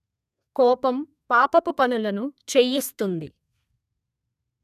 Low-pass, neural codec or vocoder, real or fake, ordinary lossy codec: 14.4 kHz; codec, 32 kHz, 1.9 kbps, SNAC; fake; none